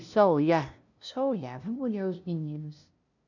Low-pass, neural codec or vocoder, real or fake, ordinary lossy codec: 7.2 kHz; codec, 16 kHz, 0.5 kbps, FunCodec, trained on LibriTTS, 25 frames a second; fake; none